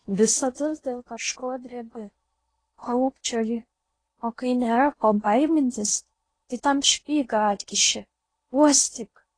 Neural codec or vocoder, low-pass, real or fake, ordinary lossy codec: codec, 16 kHz in and 24 kHz out, 0.8 kbps, FocalCodec, streaming, 65536 codes; 9.9 kHz; fake; AAC, 32 kbps